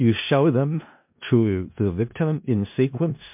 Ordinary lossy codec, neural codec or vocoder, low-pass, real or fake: MP3, 32 kbps; codec, 16 kHz in and 24 kHz out, 0.4 kbps, LongCat-Audio-Codec, four codebook decoder; 3.6 kHz; fake